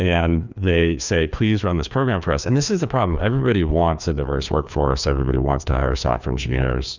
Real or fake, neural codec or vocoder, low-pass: fake; codec, 16 kHz, 2 kbps, FreqCodec, larger model; 7.2 kHz